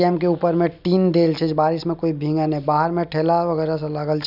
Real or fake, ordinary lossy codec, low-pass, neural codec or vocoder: real; none; 5.4 kHz; none